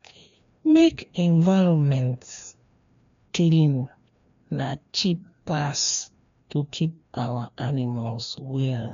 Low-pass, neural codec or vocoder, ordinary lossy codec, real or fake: 7.2 kHz; codec, 16 kHz, 1 kbps, FreqCodec, larger model; MP3, 64 kbps; fake